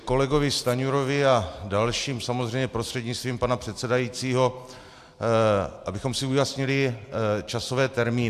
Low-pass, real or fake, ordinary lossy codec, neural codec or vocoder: 14.4 kHz; real; AAC, 96 kbps; none